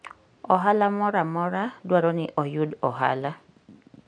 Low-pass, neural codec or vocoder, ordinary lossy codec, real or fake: 9.9 kHz; none; none; real